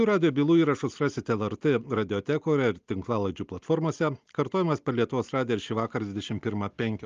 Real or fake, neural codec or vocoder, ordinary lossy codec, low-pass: real; none; Opus, 32 kbps; 7.2 kHz